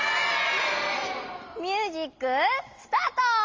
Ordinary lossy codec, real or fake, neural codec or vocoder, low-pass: Opus, 32 kbps; real; none; 7.2 kHz